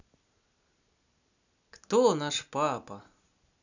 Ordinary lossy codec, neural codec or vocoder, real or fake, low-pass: none; none; real; 7.2 kHz